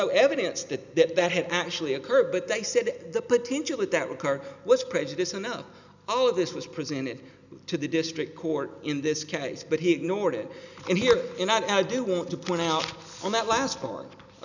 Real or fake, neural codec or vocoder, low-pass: real; none; 7.2 kHz